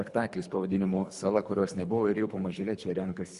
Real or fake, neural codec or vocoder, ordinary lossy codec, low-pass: fake; codec, 24 kHz, 3 kbps, HILCodec; Opus, 64 kbps; 10.8 kHz